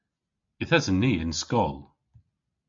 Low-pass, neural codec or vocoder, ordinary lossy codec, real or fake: 7.2 kHz; none; MP3, 48 kbps; real